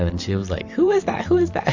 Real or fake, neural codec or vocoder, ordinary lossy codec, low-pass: fake; vocoder, 44.1 kHz, 128 mel bands every 512 samples, BigVGAN v2; AAC, 48 kbps; 7.2 kHz